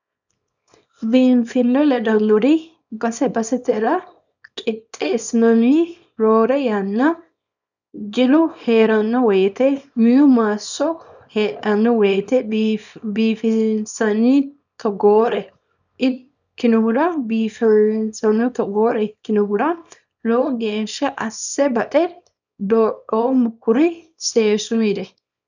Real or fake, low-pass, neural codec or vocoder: fake; 7.2 kHz; codec, 24 kHz, 0.9 kbps, WavTokenizer, small release